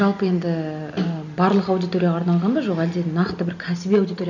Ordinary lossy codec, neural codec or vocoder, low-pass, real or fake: none; none; 7.2 kHz; real